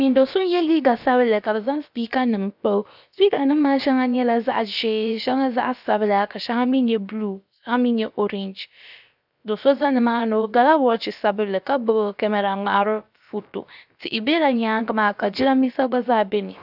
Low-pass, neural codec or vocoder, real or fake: 5.4 kHz; codec, 16 kHz, about 1 kbps, DyCAST, with the encoder's durations; fake